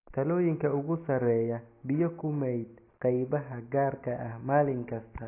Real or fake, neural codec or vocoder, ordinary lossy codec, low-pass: real; none; AAC, 24 kbps; 3.6 kHz